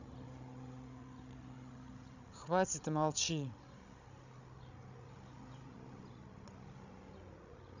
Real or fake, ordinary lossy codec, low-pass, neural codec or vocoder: fake; none; 7.2 kHz; codec, 16 kHz, 16 kbps, FreqCodec, larger model